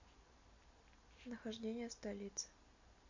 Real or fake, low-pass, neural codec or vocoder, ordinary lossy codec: real; 7.2 kHz; none; MP3, 48 kbps